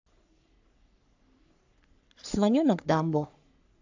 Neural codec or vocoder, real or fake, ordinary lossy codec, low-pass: codec, 44.1 kHz, 3.4 kbps, Pupu-Codec; fake; AAC, 48 kbps; 7.2 kHz